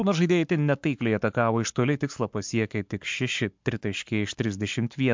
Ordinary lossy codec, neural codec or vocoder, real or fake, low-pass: MP3, 64 kbps; codec, 44.1 kHz, 7.8 kbps, Pupu-Codec; fake; 7.2 kHz